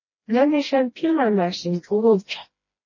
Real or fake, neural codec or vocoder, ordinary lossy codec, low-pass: fake; codec, 16 kHz, 1 kbps, FreqCodec, smaller model; MP3, 32 kbps; 7.2 kHz